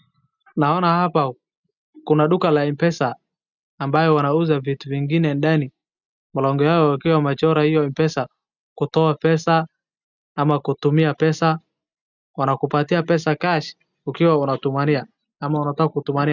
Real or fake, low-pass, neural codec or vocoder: real; 7.2 kHz; none